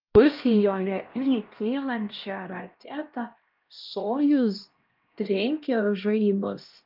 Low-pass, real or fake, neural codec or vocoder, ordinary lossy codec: 5.4 kHz; fake; codec, 16 kHz, 1 kbps, X-Codec, HuBERT features, trained on LibriSpeech; Opus, 24 kbps